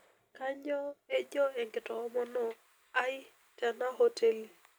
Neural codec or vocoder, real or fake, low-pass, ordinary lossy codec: none; real; none; none